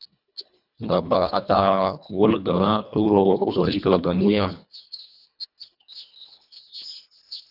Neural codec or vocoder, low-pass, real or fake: codec, 24 kHz, 1.5 kbps, HILCodec; 5.4 kHz; fake